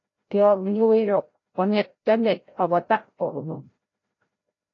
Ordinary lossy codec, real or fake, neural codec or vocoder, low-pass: AAC, 32 kbps; fake; codec, 16 kHz, 0.5 kbps, FreqCodec, larger model; 7.2 kHz